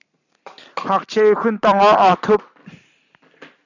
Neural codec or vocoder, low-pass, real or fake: none; 7.2 kHz; real